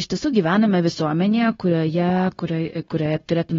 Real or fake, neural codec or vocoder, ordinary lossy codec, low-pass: fake; codec, 16 kHz, 0.9 kbps, LongCat-Audio-Codec; AAC, 24 kbps; 7.2 kHz